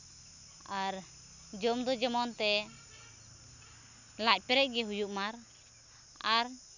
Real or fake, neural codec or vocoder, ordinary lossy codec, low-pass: real; none; none; 7.2 kHz